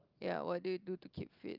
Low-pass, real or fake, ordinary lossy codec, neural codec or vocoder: 5.4 kHz; real; Opus, 24 kbps; none